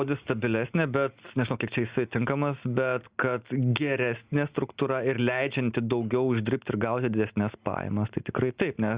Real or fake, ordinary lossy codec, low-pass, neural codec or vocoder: real; Opus, 64 kbps; 3.6 kHz; none